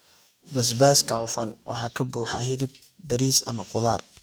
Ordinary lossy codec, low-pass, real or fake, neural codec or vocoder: none; none; fake; codec, 44.1 kHz, 2.6 kbps, DAC